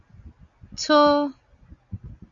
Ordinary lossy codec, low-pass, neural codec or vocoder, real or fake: Opus, 64 kbps; 7.2 kHz; none; real